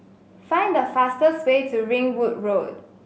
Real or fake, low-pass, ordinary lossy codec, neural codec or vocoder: real; none; none; none